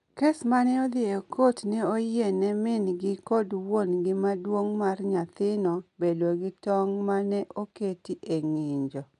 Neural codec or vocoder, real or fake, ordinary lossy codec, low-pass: none; real; AAC, 96 kbps; 10.8 kHz